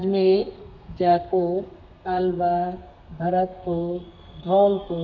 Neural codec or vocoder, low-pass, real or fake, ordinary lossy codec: codec, 32 kHz, 1.9 kbps, SNAC; 7.2 kHz; fake; none